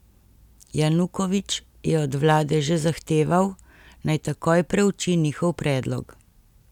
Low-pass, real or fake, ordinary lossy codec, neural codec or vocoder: 19.8 kHz; real; none; none